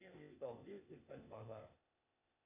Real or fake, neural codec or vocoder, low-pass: fake; codec, 16 kHz, 0.8 kbps, ZipCodec; 3.6 kHz